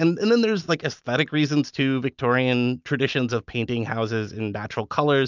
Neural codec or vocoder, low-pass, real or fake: none; 7.2 kHz; real